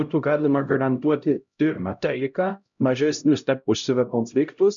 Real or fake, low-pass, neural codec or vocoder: fake; 7.2 kHz; codec, 16 kHz, 0.5 kbps, X-Codec, HuBERT features, trained on LibriSpeech